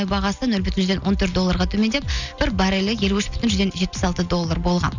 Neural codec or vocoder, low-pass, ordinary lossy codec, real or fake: none; 7.2 kHz; none; real